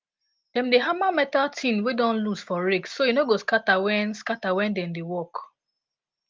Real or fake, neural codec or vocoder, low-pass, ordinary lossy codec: real; none; 7.2 kHz; Opus, 24 kbps